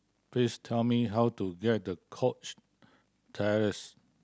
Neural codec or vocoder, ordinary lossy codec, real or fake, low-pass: none; none; real; none